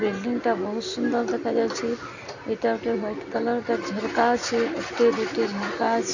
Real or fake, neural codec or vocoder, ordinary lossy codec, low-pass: real; none; none; 7.2 kHz